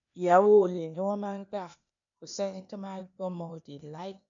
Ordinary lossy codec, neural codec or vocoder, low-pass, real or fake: none; codec, 16 kHz, 0.8 kbps, ZipCodec; 7.2 kHz; fake